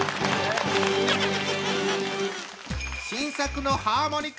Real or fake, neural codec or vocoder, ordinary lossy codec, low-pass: real; none; none; none